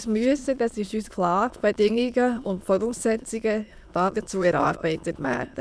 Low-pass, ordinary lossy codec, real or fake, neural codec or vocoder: none; none; fake; autoencoder, 22.05 kHz, a latent of 192 numbers a frame, VITS, trained on many speakers